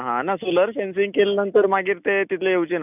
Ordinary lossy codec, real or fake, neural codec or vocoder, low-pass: none; real; none; 3.6 kHz